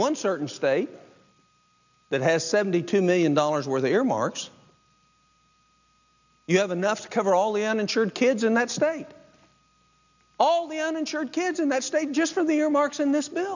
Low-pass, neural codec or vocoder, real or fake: 7.2 kHz; none; real